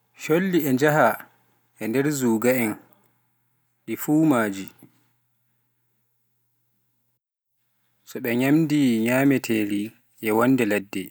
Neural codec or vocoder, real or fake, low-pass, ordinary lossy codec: none; real; none; none